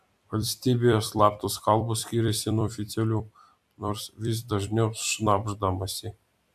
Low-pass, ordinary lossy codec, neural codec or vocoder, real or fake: 14.4 kHz; AAC, 96 kbps; vocoder, 44.1 kHz, 128 mel bands every 256 samples, BigVGAN v2; fake